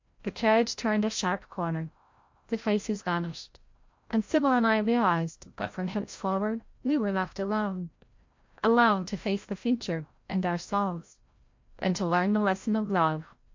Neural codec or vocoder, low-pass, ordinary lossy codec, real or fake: codec, 16 kHz, 0.5 kbps, FreqCodec, larger model; 7.2 kHz; MP3, 48 kbps; fake